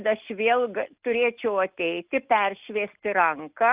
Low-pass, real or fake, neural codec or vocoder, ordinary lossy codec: 3.6 kHz; real; none; Opus, 24 kbps